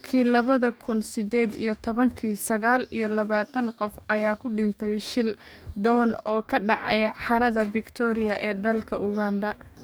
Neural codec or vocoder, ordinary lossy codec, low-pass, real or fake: codec, 44.1 kHz, 2.6 kbps, DAC; none; none; fake